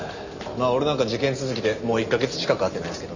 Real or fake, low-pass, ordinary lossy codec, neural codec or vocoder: real; 7.2 kHz; none; none